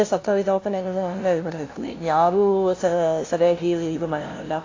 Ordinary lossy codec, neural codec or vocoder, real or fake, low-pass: AAC, 32 kbps; codec, 16 kHz, 0.5 kbps, FunCodec, trained on LibriTTS, 25 frames a second; fake; 7.2 kHz